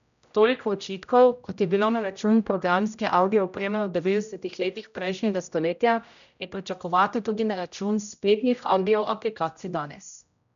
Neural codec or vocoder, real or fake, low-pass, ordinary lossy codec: codec, 16 kHz, 0.5 kbps, X-Codec, HuBERT features, trained on general audio; fake; 7.2 kHz; none